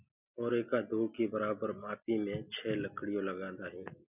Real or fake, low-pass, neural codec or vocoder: real; 3.6 kHz; none